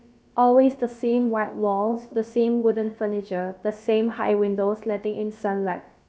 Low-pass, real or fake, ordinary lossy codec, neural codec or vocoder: none; fake; none; codec, 16 kHz, about 1 kbps, DyCAST, with the encoder's durations